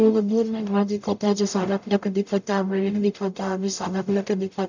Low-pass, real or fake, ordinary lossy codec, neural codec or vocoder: 7.2 kHz; fake; none; codec, 44.1 kHz, 0.9 kbps, DAC